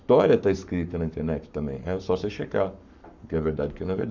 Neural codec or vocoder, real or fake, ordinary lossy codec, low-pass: codec, 44.1 kHz, 7.8 kbps, Pupu-Codec; fake; none; 7.2 kHz